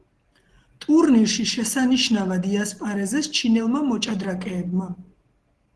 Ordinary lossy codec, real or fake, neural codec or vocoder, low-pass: Opus, 16 kbps; real; none; 10.8 kHz